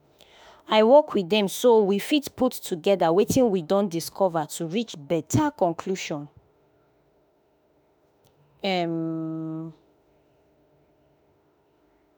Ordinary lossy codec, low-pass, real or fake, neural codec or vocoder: none; none; fake; autoencoder, 48 kHz, 32 numbers a frame, DAC-VAE, trained on Japanese speech